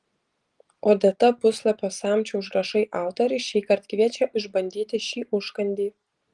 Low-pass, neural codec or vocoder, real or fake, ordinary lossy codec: 10.8 kHz; none; real; Opus, 24 kbps